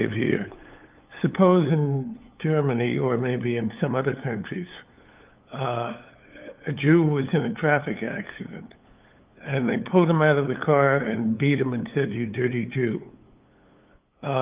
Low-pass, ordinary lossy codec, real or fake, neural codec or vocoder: 3.6 kHz; Opus, 32 kbps; fake; codec, 16 kHz, 8 kbps, FunCodec, trained on LibriTTS, 25 frames a second